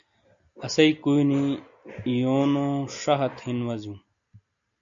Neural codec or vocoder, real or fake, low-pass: none; real; 7.2 kHz